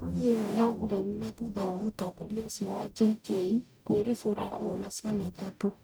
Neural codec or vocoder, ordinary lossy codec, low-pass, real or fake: codec, 44.1 kHz, 0.9 kbps, DAC; none; none; fake